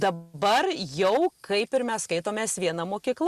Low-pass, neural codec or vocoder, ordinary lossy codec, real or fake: 14.4 kHz; none; Opus, 64 kbps; real